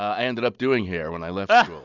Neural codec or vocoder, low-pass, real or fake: none; 7.2 kHz; real